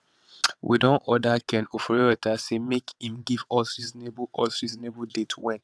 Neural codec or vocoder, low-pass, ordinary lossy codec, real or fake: vocoder, 22.05 kHz, 80 mel bands, WaveNeXt; none; none; fake